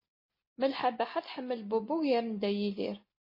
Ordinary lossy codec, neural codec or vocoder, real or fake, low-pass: MP3, 24 kbps; none; real; 5.4 kHz